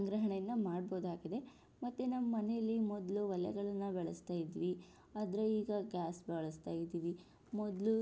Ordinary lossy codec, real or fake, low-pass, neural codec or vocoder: none; real; none; none